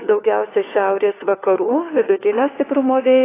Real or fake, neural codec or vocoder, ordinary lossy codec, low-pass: fake; codec, 16 kHz, 4 kbps, FunCodec, trained on LibriTTS, 50 frames a second; AAC, 16 kbps; 3.6 kHz